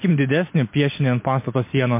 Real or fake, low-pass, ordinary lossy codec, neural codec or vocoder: real; 3.6 kHz; MP3, 24 kbps; none